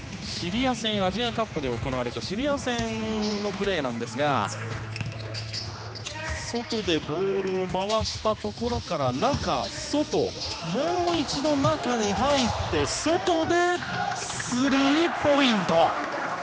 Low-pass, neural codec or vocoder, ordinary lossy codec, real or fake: none; codec, 16 kHz, 2 kbps, X-Codec, HuBERT features, trained on general audio; none; fake